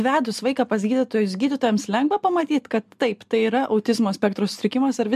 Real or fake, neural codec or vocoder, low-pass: real; none; 14.4 kHz